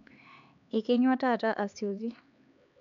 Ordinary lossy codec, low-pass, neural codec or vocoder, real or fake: none; 7.2 kHz; codec, 16 kHz, 4 kbps, X-Codec, HuBERT features, trained on LibriSpeech; fake